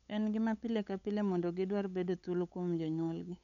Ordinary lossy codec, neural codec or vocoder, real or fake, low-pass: none; codec, 16 kHz, 8 kbps, FunCodec, trained on LibriTTS, 25 frames a second; fake; 7.2 kHz